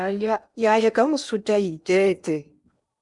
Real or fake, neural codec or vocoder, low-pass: fake; codec, 16 kHz in and 24 kHz out, 0.8 kbps, FocalCodec, streaming, 65536 codes; 10.8 kHz